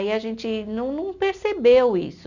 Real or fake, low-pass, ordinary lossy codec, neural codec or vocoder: real; 7.2 kHz; none; none